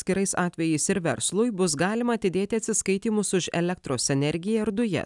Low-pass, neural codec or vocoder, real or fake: 10.8 kHz; none; real